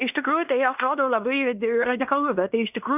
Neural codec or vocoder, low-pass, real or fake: codec, 16 kHz in and 24 kHz out, 0.9 kbps, LongCat-Audio-Codec, fine tuned four codebook decoder; 3.6 kHz; fake